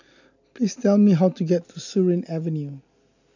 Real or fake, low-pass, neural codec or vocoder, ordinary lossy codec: real; 7.2 kHz; none; none